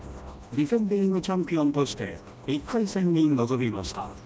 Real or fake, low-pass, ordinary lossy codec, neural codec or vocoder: fake; none; none; codec, 16 kHz, 1 kbps, FreqCodec, smaller model